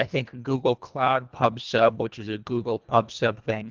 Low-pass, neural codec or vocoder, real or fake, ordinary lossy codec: 7.2 kHz; codec, 24 kHz, 1.5 kbps, HILCodec; fake; Opus, 32 kbps